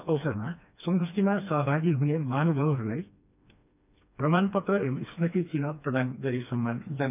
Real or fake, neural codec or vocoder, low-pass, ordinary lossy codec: fake; codec, 16 kHz, 2 kbps, FreqCodec, smaller model; 3.6 kHz; none